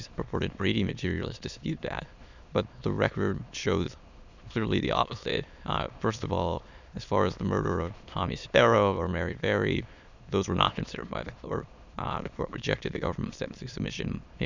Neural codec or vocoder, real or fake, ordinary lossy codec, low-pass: autoencoder, 22.05 kHz, a latent of 192 numbers a frame, VITS, trained on many speakers; fake; Opus, 64 kbps; 7.2 kHz